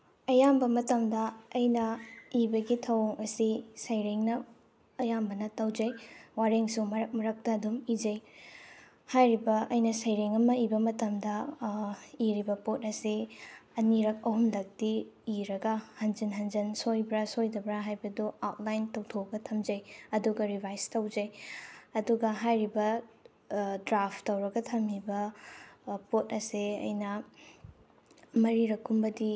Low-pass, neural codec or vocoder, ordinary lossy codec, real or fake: none; none; none; real